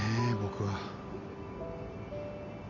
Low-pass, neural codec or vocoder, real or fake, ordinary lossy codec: 7.2 kHz; none; real; none